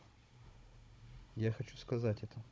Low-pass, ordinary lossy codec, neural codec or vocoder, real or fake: none; none; codec, 16 kHz, 16 kbps, FunCodec, trained on Chinese and English, 50 frames a second; fake